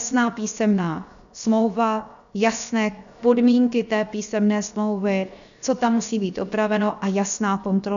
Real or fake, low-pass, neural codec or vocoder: fake; 7.2 kHz; codec, 16 kHz, 0.7 kbps, FocalCodec